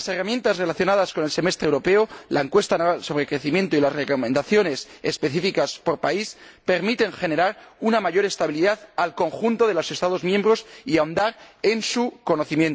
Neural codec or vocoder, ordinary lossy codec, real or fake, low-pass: none; none; real; none